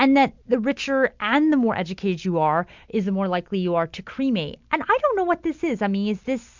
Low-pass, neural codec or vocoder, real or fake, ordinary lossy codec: 7.2 kHz; none; real; MP3, 64 kbps